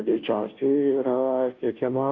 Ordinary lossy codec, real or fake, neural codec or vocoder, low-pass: AAC, 48 kbps; fake; codec, 16 kHz, 0.5 kbps, FunCodec, trained on Chinese and English, 25 frames a second; 7.2 kHz